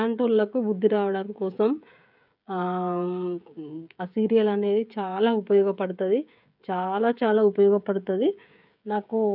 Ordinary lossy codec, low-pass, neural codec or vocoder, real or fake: none; 5.4 kHz; codec, 16 kHz, 16 kbps, FreqCodec, smaller model; fake